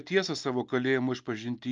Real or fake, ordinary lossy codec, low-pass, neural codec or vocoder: real; Opus, 24 kbps; 7.2 kHz; none